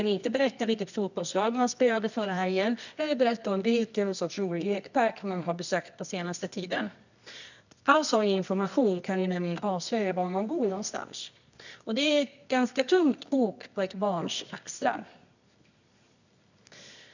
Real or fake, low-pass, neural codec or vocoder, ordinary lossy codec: fake; 7.2 kHz; codec, 24 kHz, 0.9 kbps, WavTokenizer, medium music audio release; none